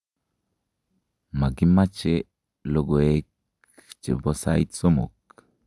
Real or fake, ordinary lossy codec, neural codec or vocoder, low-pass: real; none; none; none